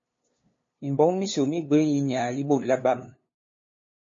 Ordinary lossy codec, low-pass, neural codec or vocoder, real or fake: MP3, 32 kbps; 7.2 kHz; codec, 16 kHz, 2 kbps, FunCodec, trained on LibriTTS, 25 frames a second; fake